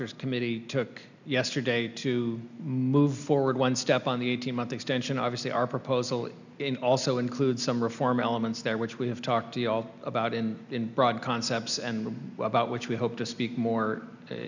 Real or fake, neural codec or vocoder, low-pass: real; none; 7.2 kHz